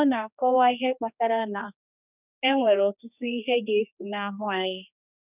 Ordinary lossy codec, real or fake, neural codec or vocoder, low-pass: none; fake; codec, 16 kHz, 2 kbps, X-Codec, HuBERT features, trained on general audio; 3.6 kHz